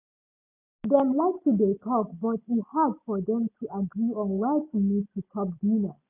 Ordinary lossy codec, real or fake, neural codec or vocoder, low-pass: none; real; none; 3.6 kHz